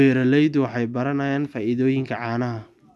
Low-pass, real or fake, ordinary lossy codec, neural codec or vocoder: none; real; none; none